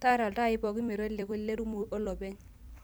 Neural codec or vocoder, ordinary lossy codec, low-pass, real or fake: vocoder, 44.1 kHz, 128 mel bands every 256 samples, BigVGAN v2; none; none; fake